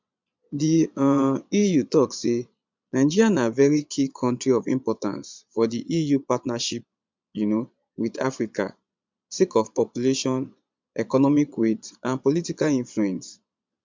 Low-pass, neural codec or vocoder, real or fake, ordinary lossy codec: 7.2 kHz; vocoder, 22.05 kHz, 80 mel bands, Vocos; fake; MP3, 64 kbps